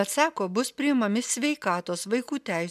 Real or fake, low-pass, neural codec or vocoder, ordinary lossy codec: real; 14.4 kHz; none; MP3, 96 kbps